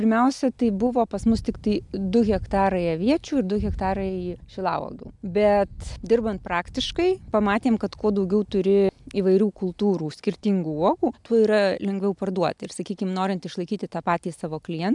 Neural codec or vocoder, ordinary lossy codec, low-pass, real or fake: none; MP3, 96 kbps; 10.8 kHz; real